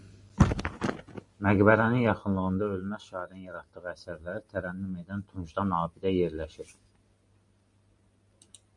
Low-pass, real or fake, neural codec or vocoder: 10.8 kHz; real; none